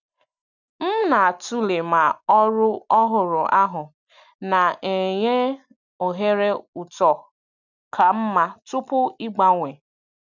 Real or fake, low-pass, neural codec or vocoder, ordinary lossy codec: real; 7.2 kHz; none; none